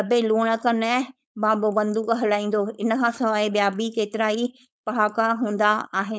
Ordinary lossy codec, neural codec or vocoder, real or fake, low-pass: none; codec, 16 kHz, 4.8 kbps, FACodec; fake; none